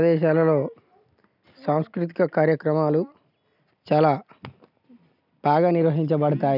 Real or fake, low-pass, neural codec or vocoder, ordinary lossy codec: real; 5.4 kHz; none; none